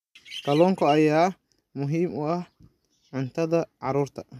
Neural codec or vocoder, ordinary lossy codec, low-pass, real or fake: none; none; 14.4 kHz; real